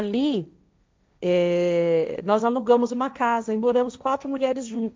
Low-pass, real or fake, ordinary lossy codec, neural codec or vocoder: none; fake; none; codec, 16 kHz, 1.1 kbps, Voila-Tokenizer